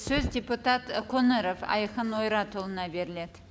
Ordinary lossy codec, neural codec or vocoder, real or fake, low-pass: none; none; real; none